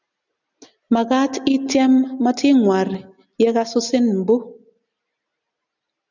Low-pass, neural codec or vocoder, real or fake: 7.2 kHz; none; real